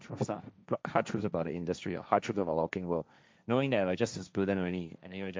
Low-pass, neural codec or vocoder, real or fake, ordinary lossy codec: none; codec, 16 kHz, 1.1 kbps, Voila-Tokenizer; fake; none